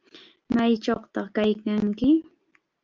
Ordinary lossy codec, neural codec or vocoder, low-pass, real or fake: Opus, 24 kbps; none; 7.2 kHz; real